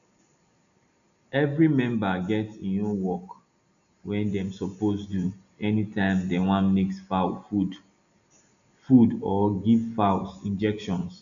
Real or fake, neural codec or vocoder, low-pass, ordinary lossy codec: real; none; 7.2 kHz; none